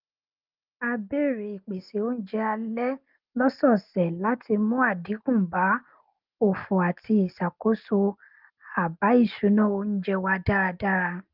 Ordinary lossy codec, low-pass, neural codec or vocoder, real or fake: Opus, 24 kbps; 5.4 kHz; none; real